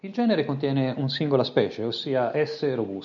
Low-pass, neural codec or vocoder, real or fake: 7.2 kHz; none; real